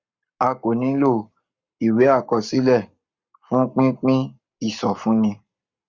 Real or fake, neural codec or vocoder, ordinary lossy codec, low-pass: fake; codec, 44.1 kHz, 7.8 kbps, Pupu-Codec; Opus, 64 kbps; 7.2 kHz